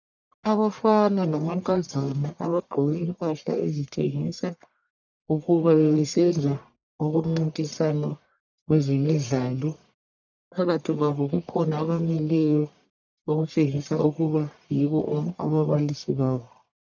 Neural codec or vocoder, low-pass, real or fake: codec, 44.1 kHz, 1.7 kbps, Pupu-Codec; 7.2 kHz; fake